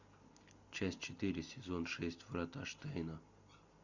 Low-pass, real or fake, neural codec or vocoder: 7.2 kHz; real; none